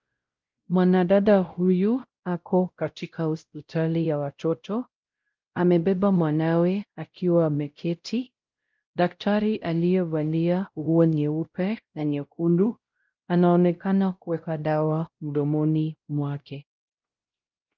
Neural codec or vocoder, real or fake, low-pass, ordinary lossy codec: codec, 16 kHz, 0.5 kbps, X-Codec, WavLM features, trained on Multilingual LibriSpeech; fake; 7.2 kHz; Opus, 32 kbps